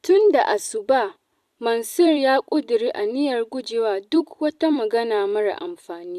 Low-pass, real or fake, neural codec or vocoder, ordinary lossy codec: 14.4 kHz; fake; vocoder, 44.1 kHz, 128 mel bands every 256 samples, BigVGAN v2; none